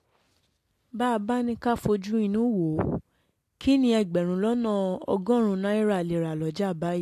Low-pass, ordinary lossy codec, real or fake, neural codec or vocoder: 14.4 kHz; AAC, 64 kbps; real; none